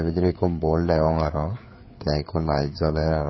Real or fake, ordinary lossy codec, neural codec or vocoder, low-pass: fake; MP3, 24 kbps; codec, 16 kHz, 4 kbps, FreqCodec, larger model; 7.2 kHz